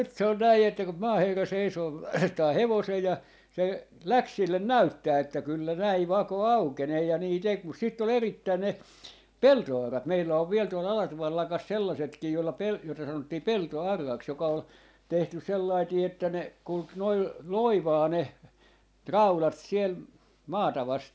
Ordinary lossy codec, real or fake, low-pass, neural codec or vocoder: none; real; none; none